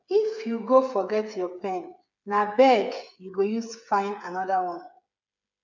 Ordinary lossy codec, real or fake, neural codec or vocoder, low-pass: none; fake; codec, 16 kHz, 8 kbps, FreqCodec, smaller model; 7.2 kHz